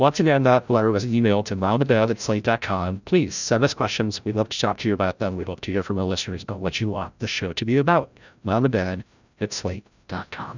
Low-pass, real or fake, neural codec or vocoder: 7.2 kHz; fake; codec, 16 kHz, 0.5 kbps, FreqCodec, larger model